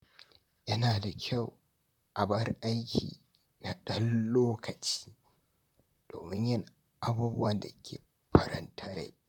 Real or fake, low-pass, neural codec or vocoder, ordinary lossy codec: fake; 19.8 kHz; vocoder, 44.1 kHz, 128 mel bands, Pupu-Vocoder; none